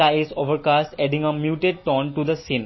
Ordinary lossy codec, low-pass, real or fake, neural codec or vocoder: MP3, 24 kbps; 7.2 kHz; real; none